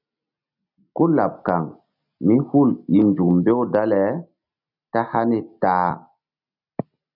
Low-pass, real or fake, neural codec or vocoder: 5.4 kHz; real; none